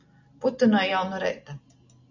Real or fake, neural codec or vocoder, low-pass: real; none; 7.2 kHz